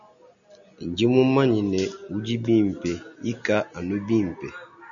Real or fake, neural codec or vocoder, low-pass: real; none; 7.2 kHz